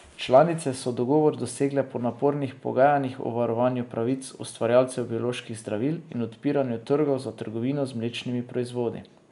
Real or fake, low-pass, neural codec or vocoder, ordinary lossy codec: real; 10.8 kHz; none; none